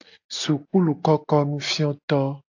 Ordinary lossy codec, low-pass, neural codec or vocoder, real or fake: AAC, 48 kbps; 7.2 kHz; none; real